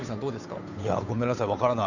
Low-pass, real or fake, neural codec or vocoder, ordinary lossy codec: 7.2 kHz; fake; codec, 16 kHz, 8 kbps, FunCodec, trained on Chinese and English, 25 frames a second; none